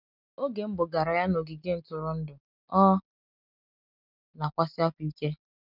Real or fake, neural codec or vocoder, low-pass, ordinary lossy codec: real; none; 5.4 kHz; none